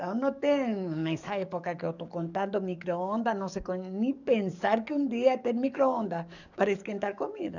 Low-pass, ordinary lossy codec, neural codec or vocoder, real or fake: 7.2 kHz; none; codec, 44.1 kHz, 7.8 kbps, DAC; fake